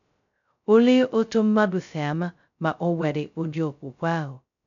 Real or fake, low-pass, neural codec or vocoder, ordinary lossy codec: fake; 7.2 kHz; codec, 16 kHz, 0.2 kbps, FocalCodec; none